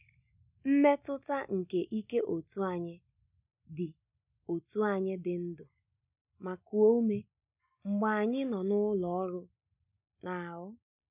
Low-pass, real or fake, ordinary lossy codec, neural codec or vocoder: 3.6 kHz; real; none; none